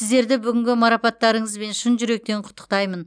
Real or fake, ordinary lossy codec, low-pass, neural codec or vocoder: real; none; 9.9 kHz; none